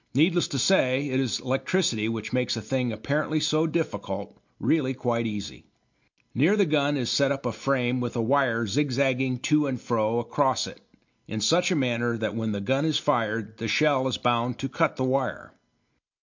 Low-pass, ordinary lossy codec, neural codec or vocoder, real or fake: 7.2 kHz; MP3, 48 kbps; none; real